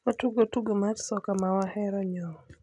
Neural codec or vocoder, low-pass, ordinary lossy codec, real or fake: none; 10.8 kHz; none; real